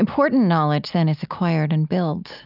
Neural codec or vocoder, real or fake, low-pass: none; real; 5.4 kHz